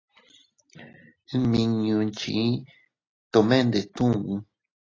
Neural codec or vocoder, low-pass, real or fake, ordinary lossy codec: none; 7.2 kHz; real; AAC, 32 kbps